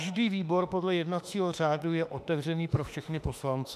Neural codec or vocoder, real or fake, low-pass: autoencoder, 48 kHz, 32 numbers a frame, DAC-VAE, trained on Japanese speech; fake; 14.4 kHz